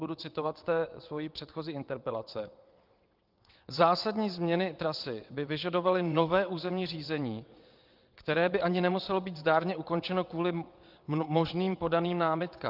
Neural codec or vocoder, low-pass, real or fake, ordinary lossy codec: vocoder, 22.05 kHz, 80 mel bands, WaveNeXt; 5.4 kHz; fake; Opus, 32 kbps